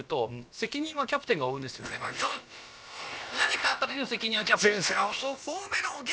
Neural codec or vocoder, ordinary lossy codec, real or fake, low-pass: codec, 16 kHz, about 1 kbps, DyCAST, with the encoder's durations; none; fake; none